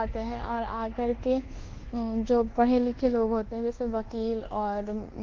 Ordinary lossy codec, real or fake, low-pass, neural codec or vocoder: Opus, 16 kbps; fake; 7.2 kHz; codec, 24 kHz, 1.2 kbps, DualCodec